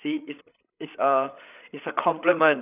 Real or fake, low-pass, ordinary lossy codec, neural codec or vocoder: fake; 3.6 kHz; none; codec, 16 kHz, 8 kbps, FreqCodec, larger model